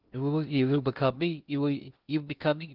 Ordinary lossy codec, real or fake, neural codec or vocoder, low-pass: Opus, 24 kbps; fake; codec, 16 kHz in and 24 kHz out, 0.6 kbps, FocalCodec, streaming, 2048 codes; 5.4 kHz